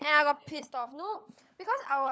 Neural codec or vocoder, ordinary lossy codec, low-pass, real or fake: codec, 16 kHz, 16 kbps, FunCodec, trained on LibriTTS, 50 frames a second; none; none; fake